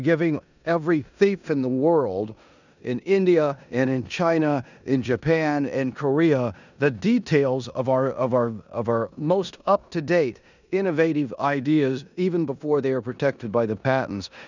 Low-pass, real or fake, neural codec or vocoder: 7.2 kHz; fake; codec, 16 kHz in and 24 kHz out, 0.9 kbps, LongCat-Audio-Codec, four codebook decoder